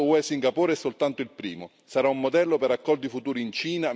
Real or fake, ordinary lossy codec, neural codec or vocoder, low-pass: real; none; none; none